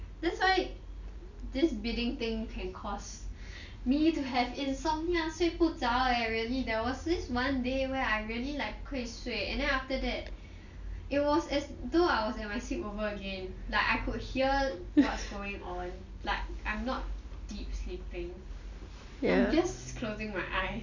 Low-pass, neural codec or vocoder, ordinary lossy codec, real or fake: 7.2 kHz; none; none; real